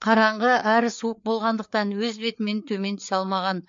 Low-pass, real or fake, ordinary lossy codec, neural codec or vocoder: 7.2 kHz; fake; MP3, 48 kbps; codec, 16 kHz, 4 kbps, FreqCodec, larger model